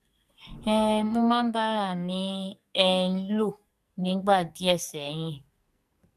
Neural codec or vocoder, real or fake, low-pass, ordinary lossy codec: codec, 44.1 kHz, 2.6 kbps, SNAC; fake; 14.4 kHz; none